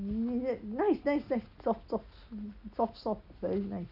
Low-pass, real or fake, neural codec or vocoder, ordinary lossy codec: 5.4 kHz; real; none; none